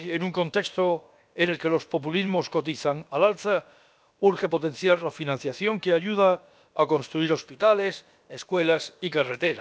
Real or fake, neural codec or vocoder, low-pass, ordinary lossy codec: fake; codec, 16 kHz, about 1 kbps, DyCAST, with the encoder's durations; none; none